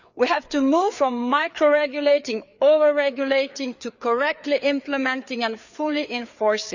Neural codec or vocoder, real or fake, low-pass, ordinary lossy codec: codec, 16 kHz, 4 kbps, FreqCodec, larger model; fake; 7.2 kHz; none